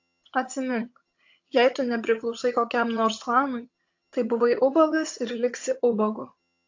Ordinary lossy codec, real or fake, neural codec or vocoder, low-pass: AAC, 48 kbps; fake; vocoder, 22.05 kHz, 80 mel bands, HiFi-GAN; 7.2 kHz